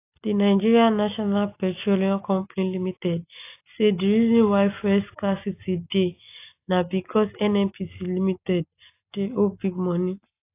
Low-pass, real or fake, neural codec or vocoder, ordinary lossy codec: 3.6 kHz; real; none; AAC, 24 kbps